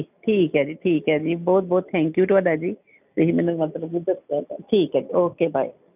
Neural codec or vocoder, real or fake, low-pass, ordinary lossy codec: none; real; 3.6 kHz; none